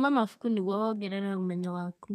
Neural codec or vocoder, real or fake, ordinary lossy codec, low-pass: codec, 32 kHz, 1.9 kbps, SNAC; fake; none; 14.4 kHz